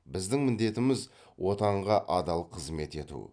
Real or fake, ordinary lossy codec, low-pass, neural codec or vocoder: real; none; 9.9 kHz; none